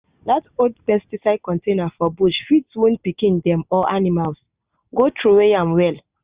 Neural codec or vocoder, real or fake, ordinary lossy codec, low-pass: none; real; Opus, 64 kbps; 3.6 kHz